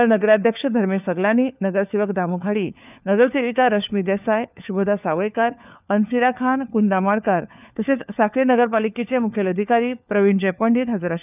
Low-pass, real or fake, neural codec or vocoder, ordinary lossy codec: 3.6 kHz; fake; codec, 16 kHz, 4 kbps, FunCodec, trained on LibriTTS, 50 frames a second; none